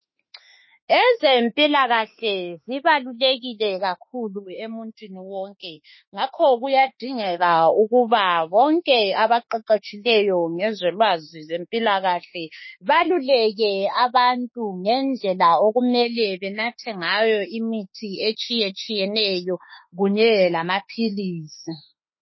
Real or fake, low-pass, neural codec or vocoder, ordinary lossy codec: fake; 7.2 kHz; autoencoder, 48 kHz, 32 numbers a frame, DAC-VAE, trained on Japanese speech; MP3, 24 kbps